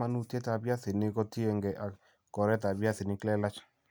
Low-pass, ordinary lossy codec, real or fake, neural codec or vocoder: none; none; real; none